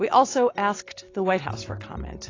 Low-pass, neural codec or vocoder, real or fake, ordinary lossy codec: 7.2 kHz; none; real; AAC, 32 kbps